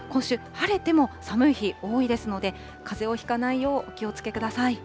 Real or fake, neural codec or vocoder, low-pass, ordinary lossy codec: real; none; none; none